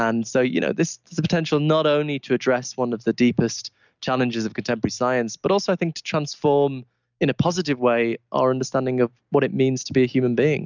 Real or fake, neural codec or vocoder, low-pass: real; none; 7.2 kHz